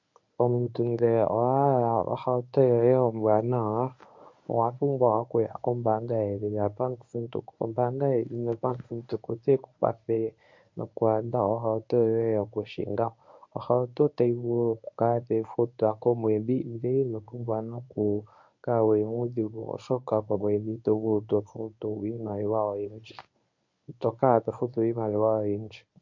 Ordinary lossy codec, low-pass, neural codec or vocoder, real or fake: MP3, 48 kbps; 7.2 kHz; codec, 24 kHz, 0.9 kbps, WavTokenizer, medium speech release version 1; fake